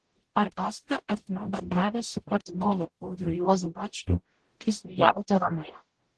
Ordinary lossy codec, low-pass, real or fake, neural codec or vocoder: Opus, 16 kbps; 10.8 kHz; fake; codec, 44.1 kHz, 0.9 kbps, DAC